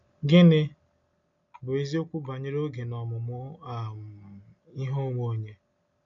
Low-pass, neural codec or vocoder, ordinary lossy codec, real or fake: 7.2 kHz; none; AAC, 64 kbps; real